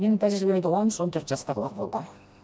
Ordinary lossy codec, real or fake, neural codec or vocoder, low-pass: none; fake; codec, 16 kHz, 1 kbps, FreqCodec, smaller model; none